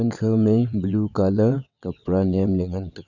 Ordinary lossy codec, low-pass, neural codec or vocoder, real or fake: none; 7.2 kHz; vocoder, 22.05 kHz, 80 mel bands, WaveNeXt; fake